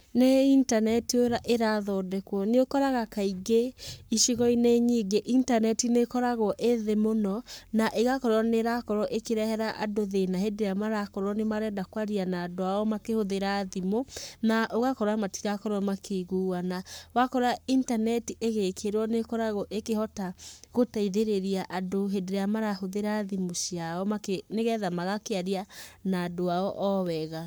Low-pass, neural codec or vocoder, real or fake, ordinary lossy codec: none; codec, 44.1 kHz, 7.8 kbps, Pupu-Codec; fake; none